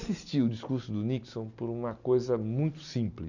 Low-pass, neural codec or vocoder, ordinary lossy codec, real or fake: 7.2 kHz; none; none; real